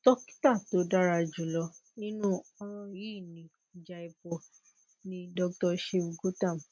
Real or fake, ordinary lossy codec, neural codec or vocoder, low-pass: real; none; none; none